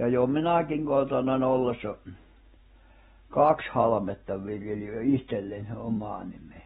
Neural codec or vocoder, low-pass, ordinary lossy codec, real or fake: none; 19.8 kHz; AAC, 16 kbps; real